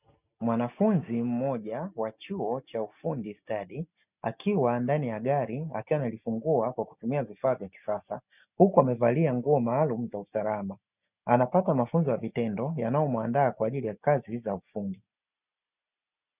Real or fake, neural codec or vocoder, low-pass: real; none; 3.6 kHz